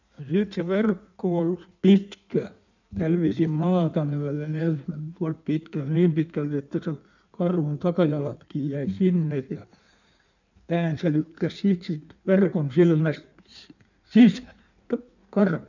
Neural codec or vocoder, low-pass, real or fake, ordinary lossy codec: codec, 16 kHz in and 24 kHz out, 1.1 kbps, FireRedTTS-2 codec; 7.2 kHz; fake; none